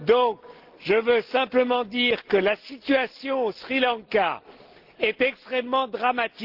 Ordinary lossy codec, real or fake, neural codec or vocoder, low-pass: Opus, 16 kbps; real; none; 5.4 kHz